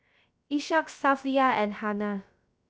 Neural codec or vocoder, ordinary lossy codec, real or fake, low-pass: codec, 16 kHz, 0.3 kbps, FocalCodec; none; fake; none